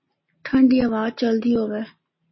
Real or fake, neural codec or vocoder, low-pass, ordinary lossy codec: real; none; 7.2 kHz; MP3, 24 kbps